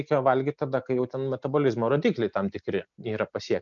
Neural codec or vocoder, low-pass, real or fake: none; 7.2 kHz; real